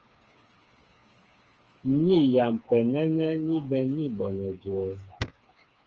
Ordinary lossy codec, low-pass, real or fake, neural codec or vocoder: Opus, 24 kbps; 7.2 kHz; fake; codec, 16 kHz, 4 kbps, FreqCodec, smaller model